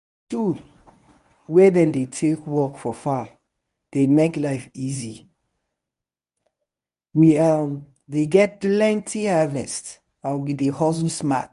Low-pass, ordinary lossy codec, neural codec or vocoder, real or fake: 10.8 kHz; none; codec, 24 kHz, 0.9 kbps, WavTokenizer, medium speech release version 1; fake